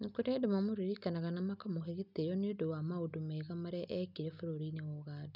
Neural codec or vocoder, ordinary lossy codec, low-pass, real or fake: none; none; 5.4 kHz; real